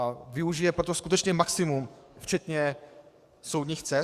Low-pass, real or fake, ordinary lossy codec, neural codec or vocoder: 14.4 kHz; fake; Opus, 64 kbps; codec, 44.1 kHz, 7.8 kbps, DAC